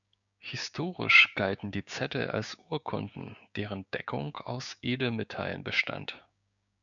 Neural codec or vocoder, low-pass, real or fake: codec, 16 kHz, 6 kbps, DAC; 7.2 kHz; fake